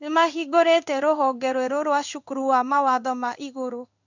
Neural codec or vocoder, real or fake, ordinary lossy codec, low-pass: codec, 16 kHz in and 24 kHz out, 1 kbps, XY-Tokenizer; fake; none; 7.2 kHz